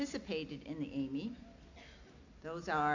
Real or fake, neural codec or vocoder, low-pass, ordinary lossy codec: real; none; 7.2 kHz; AAC, 48 kbps